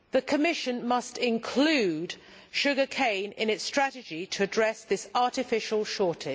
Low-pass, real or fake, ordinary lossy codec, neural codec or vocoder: none; real; none; none